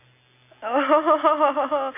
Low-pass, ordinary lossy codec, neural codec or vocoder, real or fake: 3.6 kHz; AAC, 24 kbps; none; real